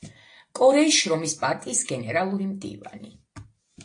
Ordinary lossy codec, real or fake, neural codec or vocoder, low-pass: AAC, 48 kbps; real; none; 9.9 kHz